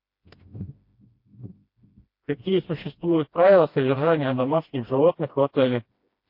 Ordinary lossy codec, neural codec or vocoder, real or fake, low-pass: MP3, 32 kbps; codec, 16 kHz, 1 kbps, FreqCodec, smaller model; fake; 5.4 kHz